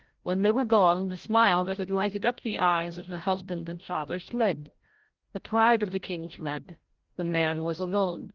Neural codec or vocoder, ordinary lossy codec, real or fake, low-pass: codec, 16 kHz, 0.5 kbps, FreqCodec, larger model; Opus, 16 kbps; fake; 7.2 kHz